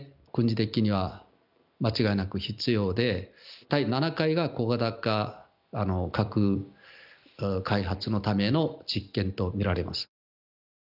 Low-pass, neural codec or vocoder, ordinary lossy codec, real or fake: 5.4 kHz; none; none; real